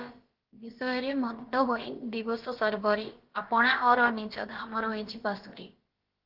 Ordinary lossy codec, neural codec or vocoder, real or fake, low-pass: Opus, 16 kbps; codec, 16 kHz, about 1 kbps, DyCAST, with the encoder's durations; fake; 5.4 kHz